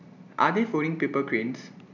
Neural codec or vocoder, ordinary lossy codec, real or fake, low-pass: none; none; real; 7.2 kHz